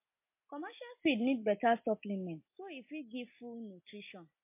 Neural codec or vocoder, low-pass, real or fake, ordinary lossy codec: none; 3.6 kHz; real; MP3, 24 kbps